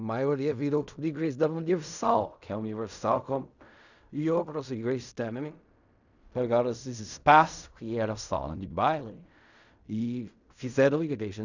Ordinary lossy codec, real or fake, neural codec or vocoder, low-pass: none; fake; codec, 16 kHz in and 24 kHz out, 0.4 kbps, LongCat-Audio-Codec, fine tuned four codebook decoder; 7.2 kHz